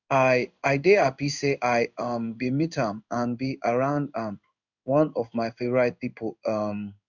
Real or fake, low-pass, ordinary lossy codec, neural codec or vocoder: fake; 7.2 kHz; none; codec, 16 kHz in and 24 kHz out, 1 kbps, XY-Tokenizer